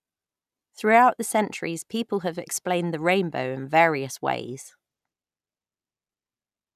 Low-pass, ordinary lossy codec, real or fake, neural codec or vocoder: 14.4 kHz; none; real; none